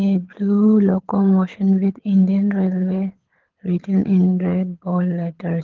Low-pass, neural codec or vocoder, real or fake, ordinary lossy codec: 7.2 kHz; codec, 24 kHz, 6 kbps, HILCodec; fake; Opus, 16 kbps